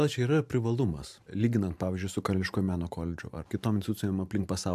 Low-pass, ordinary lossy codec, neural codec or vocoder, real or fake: 14.4 kHz; AAC, 96 kbps; vocoder, 44.1 kHz, 128 mel bands every 512 samples, BigVGAN v2; fake